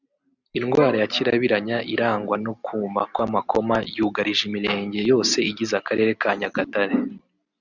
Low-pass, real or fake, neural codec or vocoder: 7.2 kHz; real; none